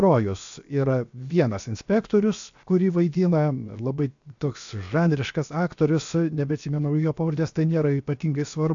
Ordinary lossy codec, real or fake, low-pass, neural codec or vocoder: AAC, 64 kbps; fake; 7.2 kHz; codec, 16 kHz, 0.7 kbps, FocalCodec